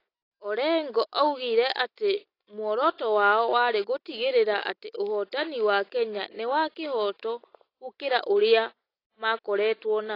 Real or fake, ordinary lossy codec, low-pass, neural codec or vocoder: real; AAC, 32 kbps; 5.4 kHz; none